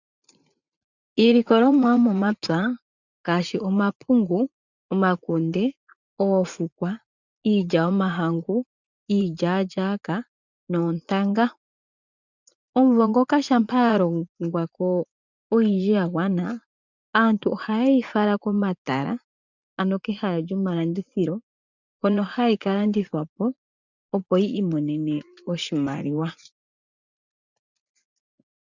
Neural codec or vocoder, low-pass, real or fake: vocoder, 44.1 kHz, 80 mel bands, Vocos; 7.2 kHz; fake